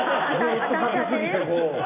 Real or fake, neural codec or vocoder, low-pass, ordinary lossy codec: real; none; 3.6 kHz; none